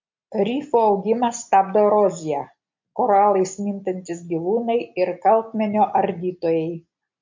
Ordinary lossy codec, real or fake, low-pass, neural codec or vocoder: MP3, 48 kbps; real; 7.2 kHz; none